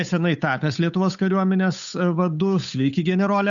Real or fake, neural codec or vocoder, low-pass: fake; codec, 16 kHz, 8 kbps, FunCodec, trained on Chinese and English, 25 frames a second; 7.2 kHz